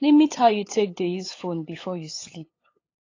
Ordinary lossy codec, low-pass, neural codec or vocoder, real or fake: AAC, 32 kbps; 7.2 kHz; codec, 16 kHz, 8 kbps, FunCodec, trained on LibriTTS, 25 frames a second; fake